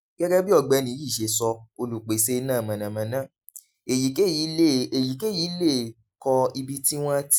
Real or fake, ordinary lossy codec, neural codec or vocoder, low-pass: real; none; none; none